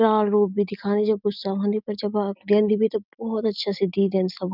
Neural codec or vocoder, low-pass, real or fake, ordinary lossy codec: none; 5.4 kHz; real; none